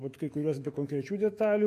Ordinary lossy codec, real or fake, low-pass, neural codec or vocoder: MP3, 64 kbps; fake; 14.4 kHz; autoencoder, 48 kHz, 128 numbers a frame, DAC-VAE, trained on Japanese speech